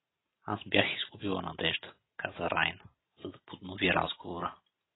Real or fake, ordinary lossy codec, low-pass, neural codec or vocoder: real; AAC, 16 kbps; 7.2 kHz; none